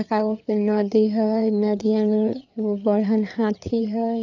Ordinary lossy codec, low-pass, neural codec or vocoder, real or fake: none; 7.2 kHz; codec, 16 kHz, 4 kbps, FreqCodec, larger model; fake